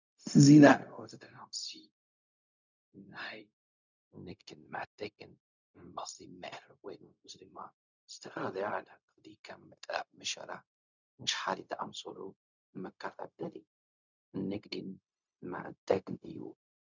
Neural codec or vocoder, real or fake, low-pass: codec, 16 kHz, 0.4 kbps, LongCat-Audio-Codec; fake; 7.2 kHz